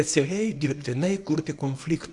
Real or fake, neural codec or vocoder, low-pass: fake; codec, 24 kHz, 0.9 kbps, WavTokenizer, small release; 10.8 kHz